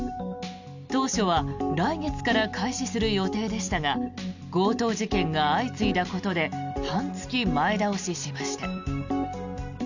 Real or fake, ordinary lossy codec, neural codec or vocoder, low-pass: real; none; none; 7.2 kHz